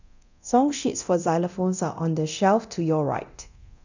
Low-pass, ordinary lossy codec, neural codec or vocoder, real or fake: 7.2 kHz; none; codec, 24 kHz, 0.9 kbps, DualCodec; fake